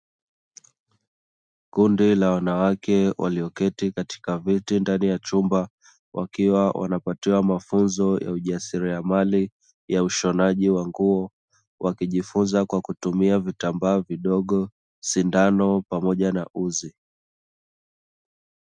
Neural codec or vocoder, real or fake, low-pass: none; real; 9.9 kHz